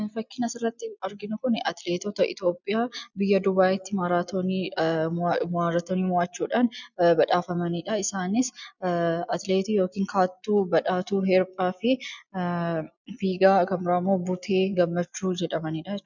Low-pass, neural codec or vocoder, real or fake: 7.2 kHz; none; real